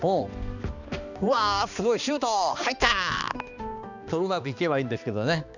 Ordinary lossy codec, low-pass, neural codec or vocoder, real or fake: none; 7.2 kHz; codec, 16 kHz, 2 kbps, X-Codec, HuBERT features, trained on balanced general audio; fake